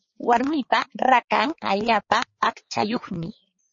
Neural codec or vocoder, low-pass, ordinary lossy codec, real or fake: codec, 16 kHz, 4 kbps, X-Codec, HuBERT features, trained on balanced general audio; 7.2 kHz; MP3, 32 kbps; fake